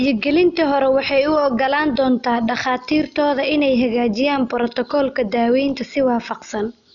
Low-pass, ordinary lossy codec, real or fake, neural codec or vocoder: 7.2 kHz; none; real; none